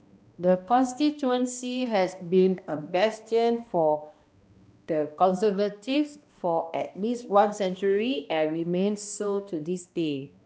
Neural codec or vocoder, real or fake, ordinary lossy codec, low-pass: codec, 16 kHz, 1 kbps, X-Codec, HuBERT features, trained on balanced general audio; fake; none; none